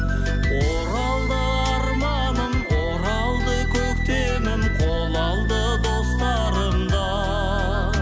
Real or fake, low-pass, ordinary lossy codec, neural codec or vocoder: real; none; none; none